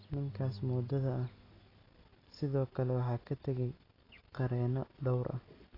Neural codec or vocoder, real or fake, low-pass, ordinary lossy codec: none; real; 5.4 kHz; AAC, 24 kbps